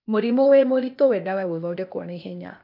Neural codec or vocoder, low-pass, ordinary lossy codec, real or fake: codec, 16 kHz, 0.8 kbps, ZipCodec; 5.4 kHz; none; fake